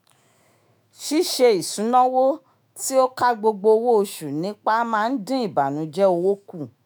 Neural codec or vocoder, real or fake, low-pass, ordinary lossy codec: autoencoder, 48 kHz, 128 numbers a frame, DAC-VAE, trained on Japanese speech; fake; none; none